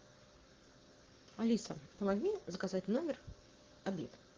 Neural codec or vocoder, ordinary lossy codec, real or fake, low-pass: codec, 44.1 kHz, 7.8 kbps, Pupu-Codec; Opus, 24 kbps; fake; 7.2 kHz